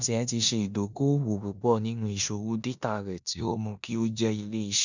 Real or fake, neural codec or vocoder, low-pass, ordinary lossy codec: fake; codec, 16 kHz in and 24 kHz out, 0.9 kbps, LongCat-Audio-Codec, four codebook decoder; 7.2 kHz; none